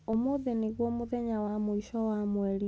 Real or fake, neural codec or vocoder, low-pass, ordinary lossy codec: real; none; none; none